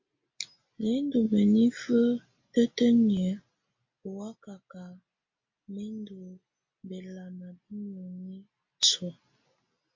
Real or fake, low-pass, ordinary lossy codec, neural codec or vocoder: real; 7.2 kHz; MP3, 48 kbps; none